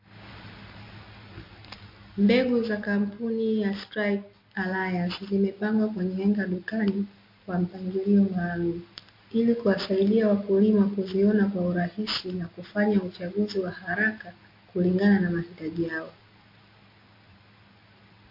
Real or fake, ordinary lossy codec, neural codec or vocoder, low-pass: real; MP3, 32 kbps; none; 5.4 kHz